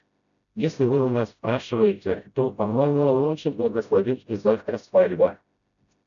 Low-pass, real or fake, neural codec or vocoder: 7.2 kHz; fake; codec, 16 kHz, 0.5 kbps, FreqCodec, smaller model